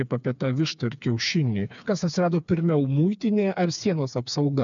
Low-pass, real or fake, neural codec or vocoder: 7.2 kHz; fake; codec, 16 kHz, 4 kbps, FreqCodec, smaller model